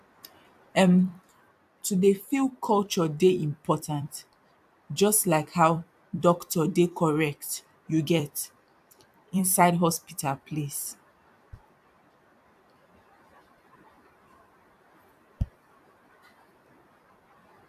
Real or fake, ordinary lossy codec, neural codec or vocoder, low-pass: fake; AAC, 96 kbps; vocoder, 44.1 kHz, 128 mel bands every 256 samples, BigVGAN v2; 14.4 kHz